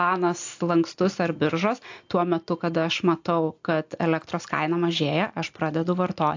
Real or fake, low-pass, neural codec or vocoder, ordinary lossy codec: real; 7.2 kHz; none; AAC, 48 kbps